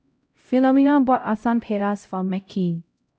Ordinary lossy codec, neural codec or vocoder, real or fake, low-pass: none; codec, 16 kHz, 0.5 kbps, X-Codec, HuBERT features, trained on LibriSpeech; fake; none